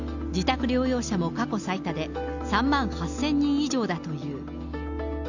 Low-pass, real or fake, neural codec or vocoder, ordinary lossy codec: 7.2 kHz; real; none; none